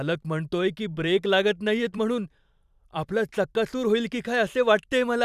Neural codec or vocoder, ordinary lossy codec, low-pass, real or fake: vocoder, 44.1 kHz, 128 mel bands every 512 samples, BigVGAN v2; none; 14.4 kHz; fake